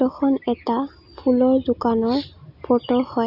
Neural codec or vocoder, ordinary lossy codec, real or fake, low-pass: none; none; real; 5.4 kHz